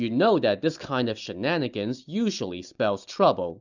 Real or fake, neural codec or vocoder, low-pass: real; none; 7.2 kHz